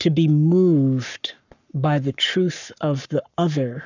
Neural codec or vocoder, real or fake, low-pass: codec, 44.1 kHz, 7.8 kbps, Pupu-Codec; fake; 7.2 kHz